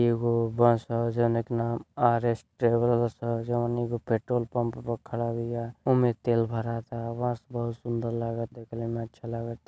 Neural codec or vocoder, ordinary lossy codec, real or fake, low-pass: none; none; real; none